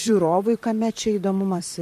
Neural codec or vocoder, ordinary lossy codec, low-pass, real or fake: vocoder, 44.1 kHz, 128 mel bands, Pupu-Vocoder; MP3, 64 kbps; 14.4 kHz; fake